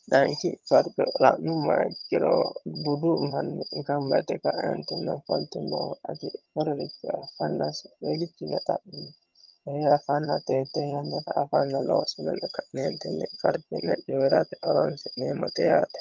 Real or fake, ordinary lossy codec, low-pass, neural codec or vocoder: fake; Opus, 24 kbps; 7.2 kHz; vocoder, 22.05 kHz, 80 mel bands, HiFi-GAN